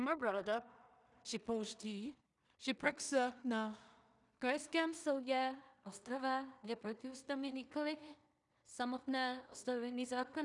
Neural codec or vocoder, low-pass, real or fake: codec, 16 kHz in and 24 kHz out, 0.4 kbps, LongCat-Audio-Codec, two codebook decoder; 10.8 kHz; fake